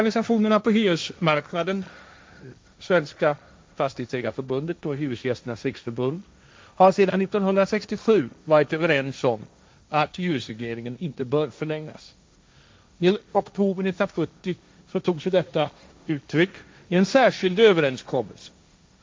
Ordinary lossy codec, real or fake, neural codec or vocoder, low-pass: none; fake; codec, 16 kHz, 1.1 kbps, Voila-Tokenizer; none